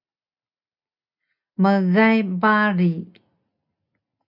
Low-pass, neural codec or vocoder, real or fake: 5.4 kHz; none; real